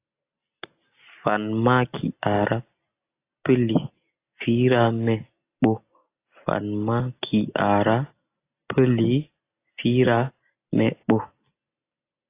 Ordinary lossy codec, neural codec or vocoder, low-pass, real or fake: AAC, 24 kbps; none; 3.6 kHz; real